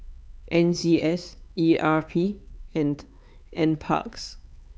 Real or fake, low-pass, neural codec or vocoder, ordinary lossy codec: fake; none; codec, 16 kHz, 4 kbps, X-Codec, HuBERT features, trained on LibriSpeech; none